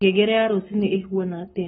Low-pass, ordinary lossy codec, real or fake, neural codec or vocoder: 19.8 kHz; AAC, 16 kbps; fake; codec, 44.1 kHz, 7.8 kbps, Pupu-Codec